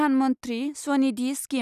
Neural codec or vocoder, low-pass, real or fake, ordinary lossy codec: none; 14.4 kHz; real; none